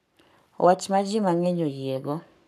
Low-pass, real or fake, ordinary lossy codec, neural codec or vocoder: 14.4 kHz; fake; none; codec, 44.1 kHz, 7.8 kbps, Pupu-Codec